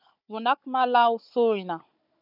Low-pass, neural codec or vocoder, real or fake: 5.4 kHz; codec, 16 kHz, 16 kbps, FunCodec, trained on Chinese and English, 50 frames a second; fake